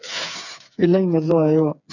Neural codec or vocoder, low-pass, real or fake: codec, 16 kHz, 4 kbps, FreqCodec, smaller model; 7.2 kHz; fake